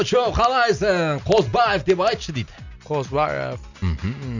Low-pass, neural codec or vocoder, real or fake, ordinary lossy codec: 7.2 kHz; none; real; none